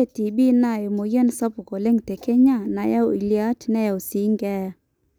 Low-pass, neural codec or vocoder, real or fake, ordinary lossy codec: 19.8 kHz; none; real; none